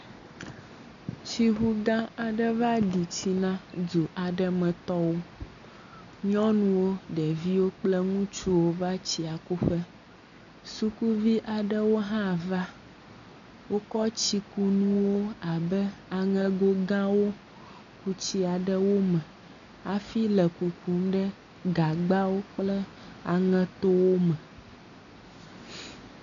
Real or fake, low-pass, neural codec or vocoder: real; 7.2 kHz; none